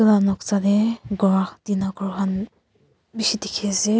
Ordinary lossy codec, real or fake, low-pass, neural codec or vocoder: none; real; none; none